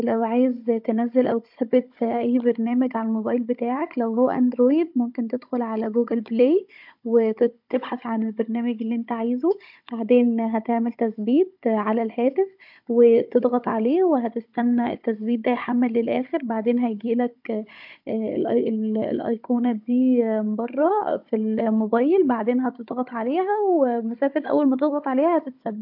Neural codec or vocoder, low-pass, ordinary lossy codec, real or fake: codec, 16 kHz, 16 kbps, FunCodec, trained on Chinese and English, 50 frames a second; 5.4 kHz; MP3, 48 kbps; fake